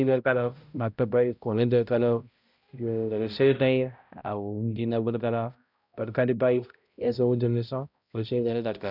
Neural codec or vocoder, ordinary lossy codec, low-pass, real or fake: codec, 16 kHz, 0.5 kbps, X-Codec, HuBERT features, trained on balanced general audio; none; 5.4 kHz; fake